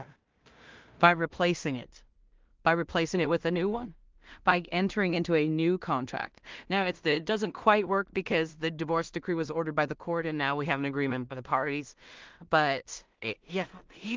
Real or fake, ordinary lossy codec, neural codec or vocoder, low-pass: fake; Opus, 32 kbps; codec, 16 kHz in and 24 kHz out, 0.4 kbps, LongCat-Audio-Codec, two codebook decoder; 7.2 kHz